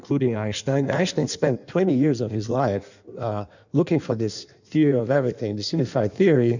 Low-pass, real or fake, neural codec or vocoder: 7.2 kHz; fake; codec, 16 kHz in and 24 kHz out, 1.1 kbps, FireRedTTS-2 codec